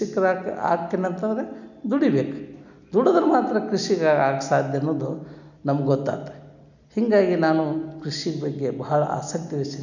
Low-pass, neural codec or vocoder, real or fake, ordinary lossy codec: 7.2 kHz; none; real; none